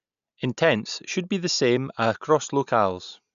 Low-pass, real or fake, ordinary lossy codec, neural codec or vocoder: 7.2 kHz; real; none; none